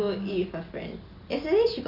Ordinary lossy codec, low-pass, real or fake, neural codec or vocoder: none; 5.4 kHz; real; none